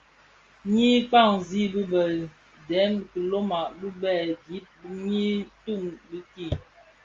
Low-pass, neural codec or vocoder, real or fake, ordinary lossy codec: 7.2 kHz; none; real; Opus, 32 kbps